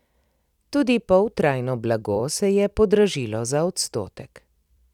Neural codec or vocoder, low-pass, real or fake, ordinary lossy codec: vocoder, 44.1 kHz, 128 mel bands every 256 samples, BigVGAN v2; 19.8 kHz; fake; none